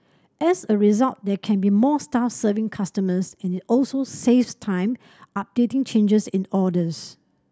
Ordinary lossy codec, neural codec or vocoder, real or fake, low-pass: none; none; real; none